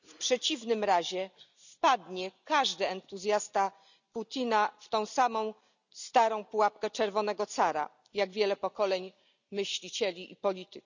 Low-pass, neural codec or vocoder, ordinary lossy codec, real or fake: 7.2 kHz; none; none; real